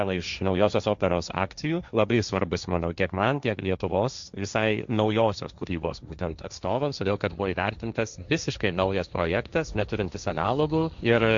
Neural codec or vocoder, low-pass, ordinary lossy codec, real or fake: codec, 16 kHz, 1.1 kbps, Voila-Tokenizer; 7.2 kHz; Opus, 64 kbps; fake